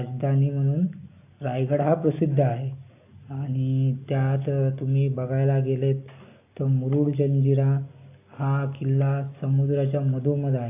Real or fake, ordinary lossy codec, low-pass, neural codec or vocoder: real; AAC, 24 kbps; 3.6 kHz; none